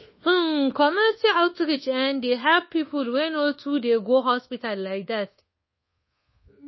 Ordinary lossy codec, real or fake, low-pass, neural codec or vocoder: MP3, 24 kbps; fake; 7.2 kHz; codec, 24 kHz, 1.2 kbps, DualCodec